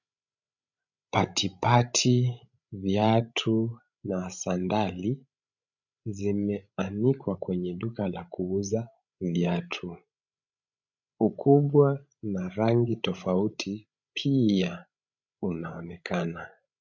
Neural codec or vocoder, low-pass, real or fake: codec, 16 kHz, 16 kbps, FreqCodec, larger model; 7.2 kHz; fake